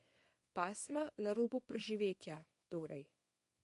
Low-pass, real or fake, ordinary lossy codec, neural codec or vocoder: 10.8 kHz; fake; MP3, 48 kbps; codec, 24 kHz, 0.9 kbps, WavTokenizer, small release